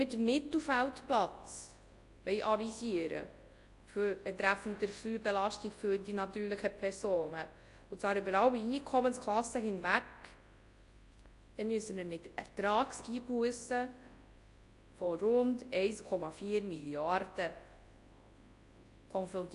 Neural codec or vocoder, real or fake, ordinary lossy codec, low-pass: codec, 24 kHz, 0.9 kbps, WavTokenizer, large speech release; fake; AAC, 48 kbps; 10.8 kHz